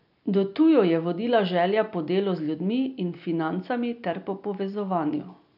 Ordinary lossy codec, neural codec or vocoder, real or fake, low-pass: none; none; real; 5.4 kHz